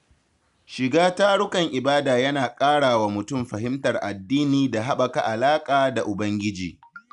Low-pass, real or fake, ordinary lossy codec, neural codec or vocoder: 10.8 kHz; real; none; none